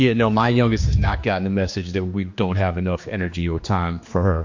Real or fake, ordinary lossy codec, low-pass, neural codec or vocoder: fake; MP3, 48 kbps; 7.2 kHz; codec, 16 kHz, 2 kbps, X-Codec, HuBERT features, trained on general audio